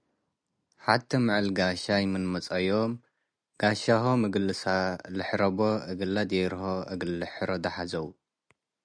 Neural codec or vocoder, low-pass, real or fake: none; 9.9 kHz; real